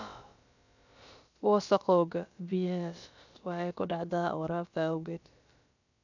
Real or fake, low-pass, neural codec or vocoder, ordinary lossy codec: fake; 7.2 kHz; codec, 16 kHz, about 1 kbps, DyCAST, with the encoder's durations; none